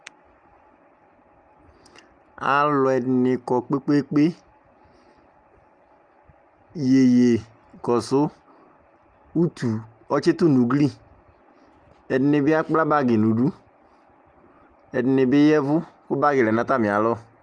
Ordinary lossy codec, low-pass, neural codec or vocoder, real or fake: Opus, 32 kbps; 9.9 kHz; none; real